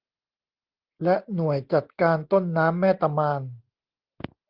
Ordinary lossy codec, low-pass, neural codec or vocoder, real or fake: Opus, 16 kbps; 5.4 kHz; none; real